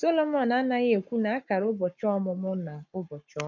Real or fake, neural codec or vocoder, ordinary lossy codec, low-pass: fake; codec, 44.1 kHz, 7.8 kbps, Pupu-Codec; none; 7.2 kHz